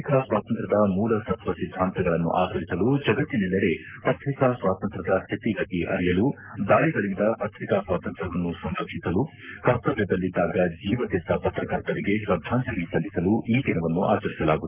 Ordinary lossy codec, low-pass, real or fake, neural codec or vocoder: none; 3.6 kHz; fake; codec, 44.1 kHz, 7.8 kbps, Pupu-Codec